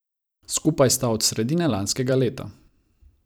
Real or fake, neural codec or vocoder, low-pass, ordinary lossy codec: fake; vocoder, 44.1 kHz, 128 mel bands every 256 samples, BigVGAN v2; none; none